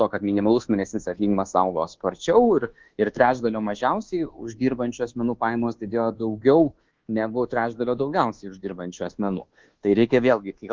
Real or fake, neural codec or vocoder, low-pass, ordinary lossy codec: fake; codec, 24 kHz, 1.2 kbps, DualCodec; 7.2 kHz; Opus, 16 kbps